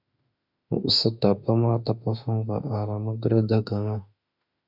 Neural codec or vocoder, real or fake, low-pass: autoencoder, 48 kHz, 32 numbers a frame, DAC-VAE, trained on Japanese speech; fake; 5.4 kHz